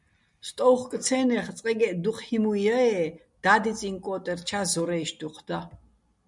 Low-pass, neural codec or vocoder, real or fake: 10.8 kHz; none; real